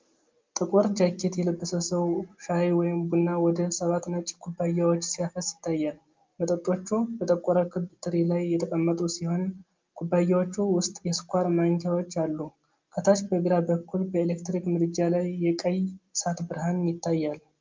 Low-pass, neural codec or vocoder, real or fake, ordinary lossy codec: 7.2 kHz; none; real; Opus, 24 kbps